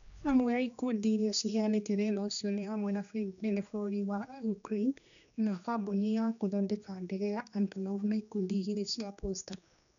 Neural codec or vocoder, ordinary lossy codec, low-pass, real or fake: codec, 16 kHz, 2 kbps, X-Codec, HuBERT features, trained on general audio; none; 7.2 kHz; fake